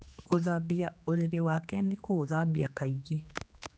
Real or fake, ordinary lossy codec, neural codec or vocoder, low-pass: fake; none; codec, 16 kHz, 2 kbps, X-Codec, HuBERT features, trained on general audio; none